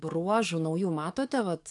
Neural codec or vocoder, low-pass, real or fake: codec, 44.1 kHz, 7.8 kbps, DAC; 10.8 kHz; fake